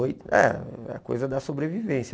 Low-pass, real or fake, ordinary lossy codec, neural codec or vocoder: none; real; none; none